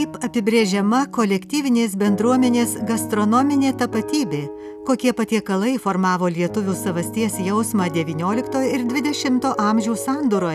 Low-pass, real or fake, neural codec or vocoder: 14.4 kHz; real; none